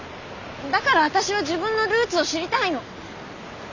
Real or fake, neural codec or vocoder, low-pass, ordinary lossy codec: real; none; 7.2 kHz; none